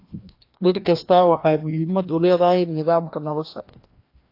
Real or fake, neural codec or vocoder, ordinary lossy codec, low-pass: fake; codec, 16 kHz, 1 kbps, FreqCodec, larger model; AAC, 32 kbps; 5.4 kHz